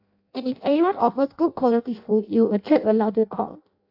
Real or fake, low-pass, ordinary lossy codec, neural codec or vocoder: fake; 5.4 kHz; AAC, 48 kbps; codec, 16 kHz in and 24 kHz out, 0.6 kbps, FireRedTTS-2 codec